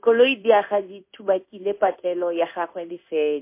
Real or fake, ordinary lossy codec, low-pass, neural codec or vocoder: fake; MP3, 24 kbps; 3.6 kHz; codec, 16 kHz in and 24 kHz out, 1 kbps, XY-Tokenizer